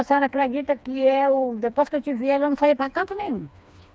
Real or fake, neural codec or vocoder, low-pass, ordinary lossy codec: fake; codec, 16 kHz, 2 kbps, FreqCodec, smaller model; none; none